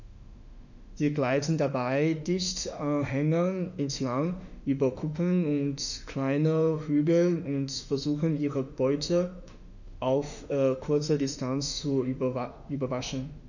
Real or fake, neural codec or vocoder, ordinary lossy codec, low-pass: fake; autoencoder, 48 kHz, 32 numbers a frame, DAC-VAE, trained on Japanese speech; none; 7.2 kHz